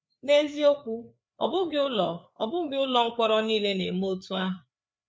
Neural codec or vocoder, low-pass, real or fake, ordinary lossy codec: codec, 16 kHz, 4 kbps, FreqCodec, larger model; none; fake; none